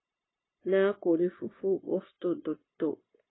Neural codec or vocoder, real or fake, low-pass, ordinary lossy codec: codec, 16 kHz, 0.9 kbps, LongCat-Audio-Codec; fake; 7.2 kHz; AAC, 16 kbps